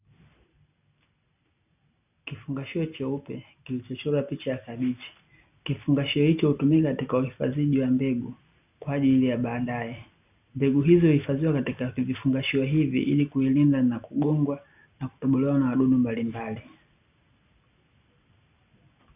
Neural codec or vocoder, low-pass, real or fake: none; 3.6 kHz; real